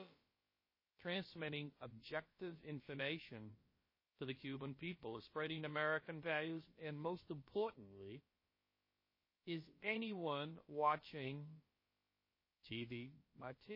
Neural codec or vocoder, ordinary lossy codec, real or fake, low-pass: codec, 16 kHz, about 1 kbps, DyCAST, with the encoder's durations; MP3, 24 kbps; fake; 5.4 kHz